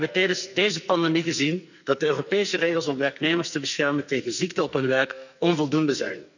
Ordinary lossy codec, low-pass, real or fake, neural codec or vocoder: none; 7.2 kHz; fake; codec, 44.1 kHz, 2.6 kbps, SNAC